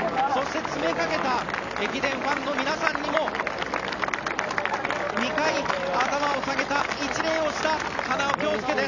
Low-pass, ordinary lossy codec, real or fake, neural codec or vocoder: 7.2 kHz; none; real; none